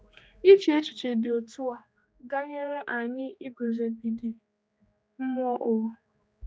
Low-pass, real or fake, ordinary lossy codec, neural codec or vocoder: none; fake; none; codec, 16 kHz, 2 kbps, X-Codec, HuBERT features, trained on general audio